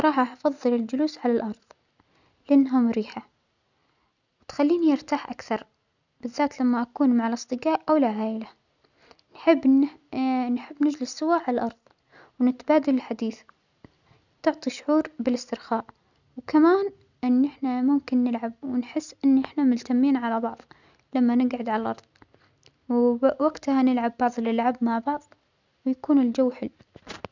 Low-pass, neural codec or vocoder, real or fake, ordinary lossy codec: 7.2 kHz; none; real; none